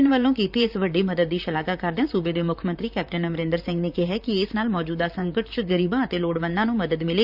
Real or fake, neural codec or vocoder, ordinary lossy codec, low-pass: fake; vocoder, 44.1 kHz, 128 mel bands, Pupu-Vocoder; none; 5.4 kHz